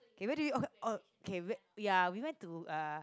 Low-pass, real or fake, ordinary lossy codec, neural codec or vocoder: none; real; none; none